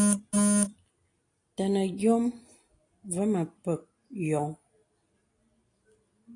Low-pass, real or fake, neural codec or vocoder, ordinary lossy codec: 10.8 kHz; real; none; AAC, 48 kbps